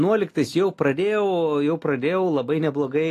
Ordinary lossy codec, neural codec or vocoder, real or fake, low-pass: AAC, 48 kbps; none; real; 14.4 kHz